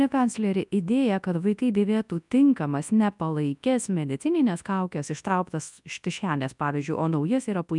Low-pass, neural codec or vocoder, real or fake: 10.8 kHz; codec, 24 kHz, 0.9 kbps, WavTokenizer, large speech release; fake